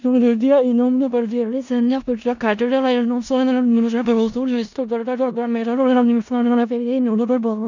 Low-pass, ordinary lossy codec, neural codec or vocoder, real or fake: 7.2 kHz; none; codec, 16 kHz in and 24 kHz out, 0.4 kbps, LongCat-Audio-Codec, four codebook decoder; fake